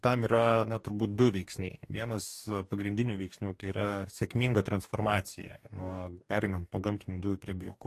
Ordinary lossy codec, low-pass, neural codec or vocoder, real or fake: AAC, 64 kbps; 14.4 kHz; codec, 44.1 kHz, 2.6 kbps, DAC; fake